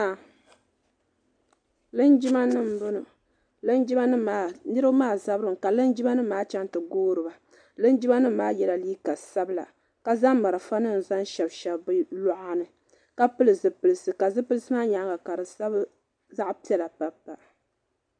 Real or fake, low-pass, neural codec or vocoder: real; 9.9 kHz; none